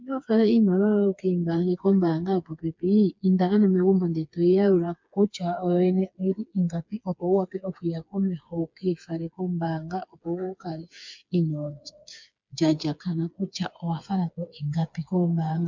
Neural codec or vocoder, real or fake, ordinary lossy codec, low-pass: codec, 16 kHz, 4 kbps, FreqCodec, smaller model; fake; MP3, 64 kbps; 7.2 kHz